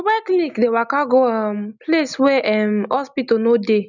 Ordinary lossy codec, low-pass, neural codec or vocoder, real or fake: none; 7.2 kHz; none; real